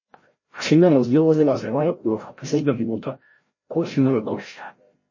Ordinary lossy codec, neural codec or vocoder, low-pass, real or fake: MP3, 32 kbps; codec, 16 kHz, 0.5 kbps, FreqCodec, larger model; 7.2 kHz; fake